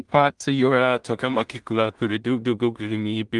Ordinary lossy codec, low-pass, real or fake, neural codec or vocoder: Opus, 24 kbps; 10.8 kHz; fake; codec, 16 kHz in and 24 kHz out, 0.4 kbps, LongCat-Audio-Codec, two codebook decoder